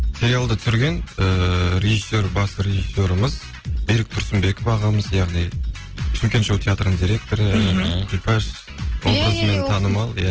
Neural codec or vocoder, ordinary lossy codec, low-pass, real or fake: none; Opus, 16 kbps; 7.2 kHz; real